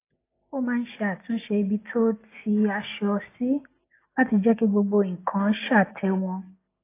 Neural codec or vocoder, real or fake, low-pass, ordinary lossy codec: none; real; 3.6 kHz; AAC, 24 kbps